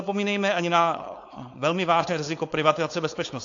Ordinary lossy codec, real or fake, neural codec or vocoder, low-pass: AAC, 48 kbps; fake; codec, 16 kHz, 4.8 kbps, FACodec; 7.2 kHz